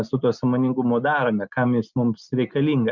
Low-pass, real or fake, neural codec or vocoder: 7.2 kHz; real; none